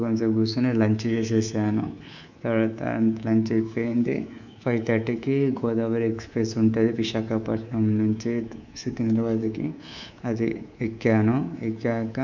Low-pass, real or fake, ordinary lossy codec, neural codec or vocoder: 7.2 kHz; fake; none; codec, 24 kHz, 3.1 kbps, DualCodec